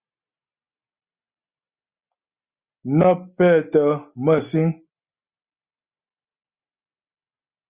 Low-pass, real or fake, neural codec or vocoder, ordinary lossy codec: 3.6 kHz; real; none; Opus, 64 kbps